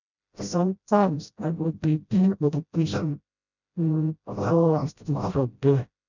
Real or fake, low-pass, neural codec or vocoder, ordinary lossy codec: fake; 7.2 kHz; codec, 16 kHz, 0.5 kbps, FreqCodec, smaller model; none